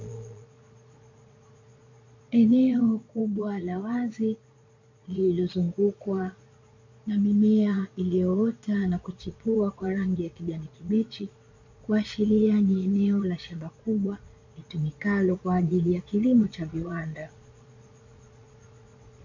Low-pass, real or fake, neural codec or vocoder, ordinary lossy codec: 7.2 kHz; fake; vocoder, 22.05 kHz, 80 mel bands, Vocos; MP3, 48 kbps